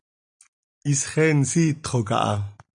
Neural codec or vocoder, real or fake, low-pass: none; real; 9.9 kHz